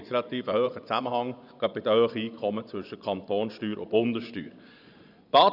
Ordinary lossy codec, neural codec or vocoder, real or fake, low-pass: none; vocoder, 24 kHz, 100 mel bands, Vocos; fake; 5.4 kHz